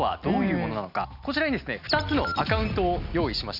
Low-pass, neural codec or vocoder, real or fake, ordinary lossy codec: 5.4 kHz; none; real; none